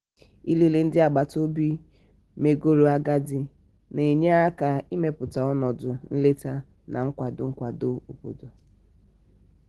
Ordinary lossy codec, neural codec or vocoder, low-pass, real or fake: Opus, 16 kbps; none; 10.8 kHz; real